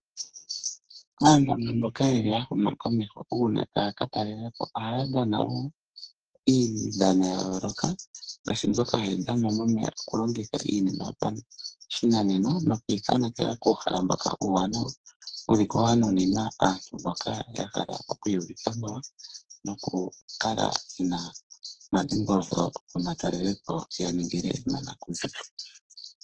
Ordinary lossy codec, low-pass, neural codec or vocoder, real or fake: Opus, 16 kbps; 9.9 kHz; codec, 44.1 kHz, 2.6 kbps, SNAC; fake